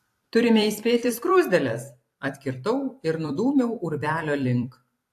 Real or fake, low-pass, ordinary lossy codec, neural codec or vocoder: fake; 14.4 kHz; AAC, 64 kbps; vocoder, 44.1 kHz, 128 mel bands every 256 samples, BigVGAN v2